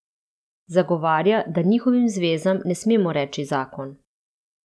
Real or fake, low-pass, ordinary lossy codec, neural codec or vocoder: real; none; none; none